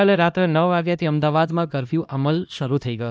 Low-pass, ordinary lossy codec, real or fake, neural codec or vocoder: none; none; fake; codec, 16 kHz, 1 kbps, X-Codec, HuBERT features, trained on LibriSpeech